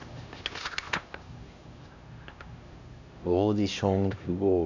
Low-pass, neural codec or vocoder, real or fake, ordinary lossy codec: 7.2 kHz; codec, 16 kHz, 1 kbps, X-Codec, HuBERT features, trained on LibriSpeech; fake; none